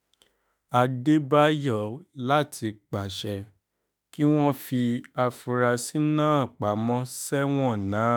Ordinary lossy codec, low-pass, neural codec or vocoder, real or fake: none; none; autoencoder, 48 kHz, 32 numbers a frame, DAC-VAE, trained on Japanese speech; fake